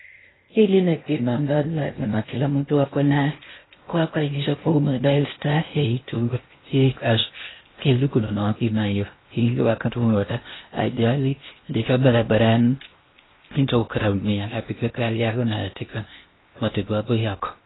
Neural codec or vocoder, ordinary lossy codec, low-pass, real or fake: codec, 16 kHz in and 24 kHz out, 0.6 kbps, FocalCodec, streaming, 4096 codes; AAC, 16 kbps; 7.2 kHz; fake